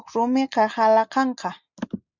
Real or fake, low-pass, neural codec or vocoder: real; 7.2 kHz; none